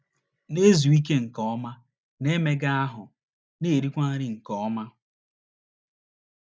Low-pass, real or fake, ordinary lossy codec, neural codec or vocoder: none; real; none; none